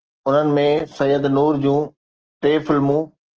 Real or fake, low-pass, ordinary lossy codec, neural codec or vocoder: real; 7.2 kHz; Opus, 16 kbps; none